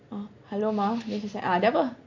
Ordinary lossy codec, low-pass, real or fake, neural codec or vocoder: none; 7.2 kHz; real; none